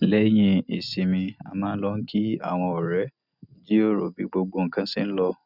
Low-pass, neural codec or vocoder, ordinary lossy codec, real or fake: 5.4 kHz; none; none; real